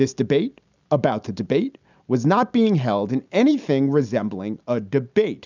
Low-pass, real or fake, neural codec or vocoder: 7.2 kHz; real; none